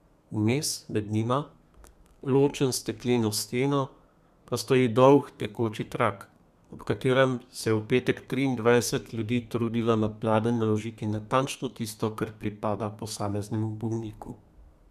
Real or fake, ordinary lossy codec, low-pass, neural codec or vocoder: fake; none; 14.4 kHz; codec, 32 kHz, 1.9 kbps, SNAC